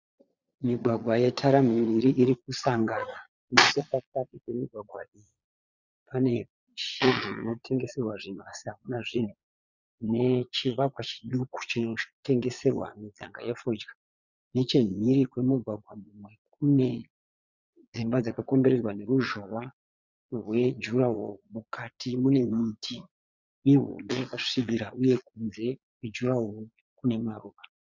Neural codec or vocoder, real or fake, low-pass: vocoder, 22.05 kHz, 80 mel bands, Vocos; fake; 7.2 kHz